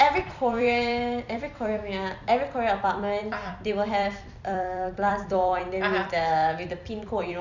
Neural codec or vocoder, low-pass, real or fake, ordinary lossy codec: vocoder, 44.1 kHz, 128 mel bands every 256 samples, BigVGAN v2; 7.2 kHz; fake; none